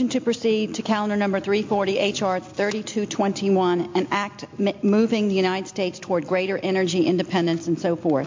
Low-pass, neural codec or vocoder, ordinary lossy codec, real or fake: 7.2 kHz; none; MP3, 48 kbps; real